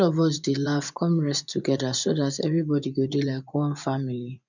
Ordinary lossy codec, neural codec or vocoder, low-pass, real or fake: none; vocoder, 24 kHz, 100 mel bands, Vocos; 7.2 kHz; fake